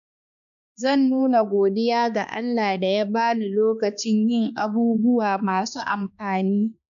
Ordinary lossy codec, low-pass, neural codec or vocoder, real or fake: none; 7.2 kHz; codec, 16 kHz, 2 kbps, X-Codec, HuBERT features, trained on balanced general audio; fake